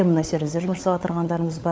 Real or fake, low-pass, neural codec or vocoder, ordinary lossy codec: fake; none; codec, 16 kHz, 16 kbps, FunCodec, trained on LibriTTS, 50 frames a second; none